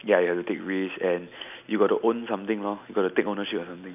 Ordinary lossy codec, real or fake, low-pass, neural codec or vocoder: none; real; 3.6 kHz; none